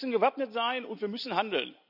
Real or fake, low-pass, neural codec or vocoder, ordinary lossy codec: real; 5.4 kHz; none; none